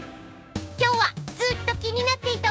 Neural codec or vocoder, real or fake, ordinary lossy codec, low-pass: codec, 16 kHz, 6 kbps, DAC; fake; none; none